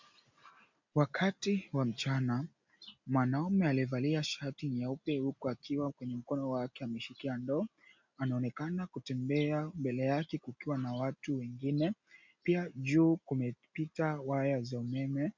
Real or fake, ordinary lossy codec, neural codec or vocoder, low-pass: real; MP3, 64 kbps; none; 7.2 kHz